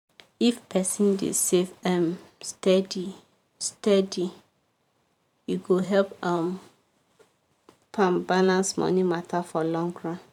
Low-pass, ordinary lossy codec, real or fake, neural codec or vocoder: 19.8 kHz; none; fake; vocoder, 44.1 kHz, 128 mel bands every 512 samples, BigVGAN v2